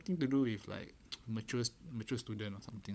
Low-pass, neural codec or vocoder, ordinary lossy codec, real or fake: none; codec, 16 kHz, 16 kbps, FunCodec, trained on LibriTTS, 50 frames a second; none; fake